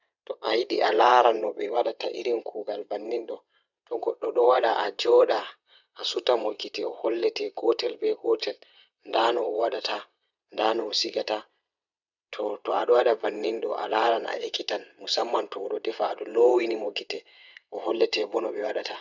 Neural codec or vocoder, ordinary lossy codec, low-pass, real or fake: vocoder, 22.05 kHz, 80 mel bands, WaveNeXt; none; 7.2 kHz; fake